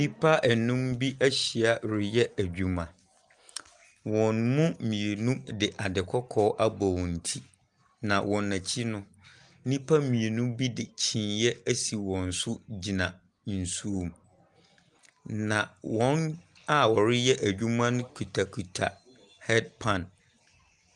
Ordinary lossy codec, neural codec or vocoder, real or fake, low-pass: Opus, 32 kbps; none; real; 10.8 kHz